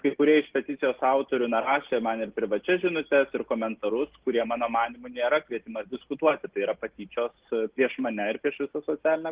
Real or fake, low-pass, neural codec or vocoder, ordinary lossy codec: real; 3.6 kHz; none; Opus, 16 kbps